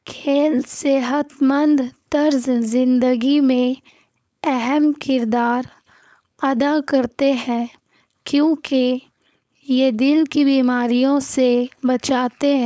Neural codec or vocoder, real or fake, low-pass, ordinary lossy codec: codec, 16 kHz, 4.8 kbps, FACodec; fake; none; none